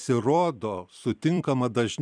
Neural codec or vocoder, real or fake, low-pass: vocoder, 44.1 kHz, 128 mel bands every 256 samples, BigVGAN v2; fake; 9.9 kHz